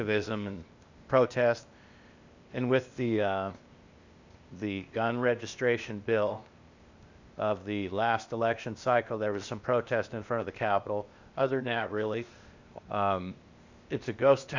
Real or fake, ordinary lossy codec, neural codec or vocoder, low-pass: fake; Opus, 64 kbps; codec, 16 kHz, 0.8 kbps, ZipCodec; 7.2 kHz